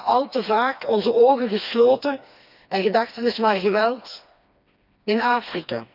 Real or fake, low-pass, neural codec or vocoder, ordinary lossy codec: fake; 5.4 kHz; codec, 16 kHz, 2 kbps, FreqCodec, smaller model; none